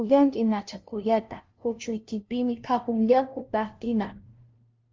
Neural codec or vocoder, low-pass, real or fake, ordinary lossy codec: codec, 16 kHz, 0.5 kbps, FunCodec, trained on LibriTTS, 25 frames a second; 7.2 kHz; fake; Opus, 32 kbps